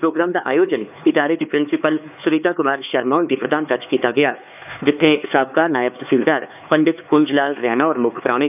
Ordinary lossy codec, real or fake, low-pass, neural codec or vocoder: none; fake; 3.6 kHz; codec, 16 kHz, 4 kbps, X-Codec, HuBERT features, trained on LibriSpeech